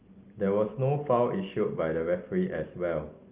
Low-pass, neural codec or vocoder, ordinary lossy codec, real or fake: 3.6 kHz; none; Opus, 24 kbps; real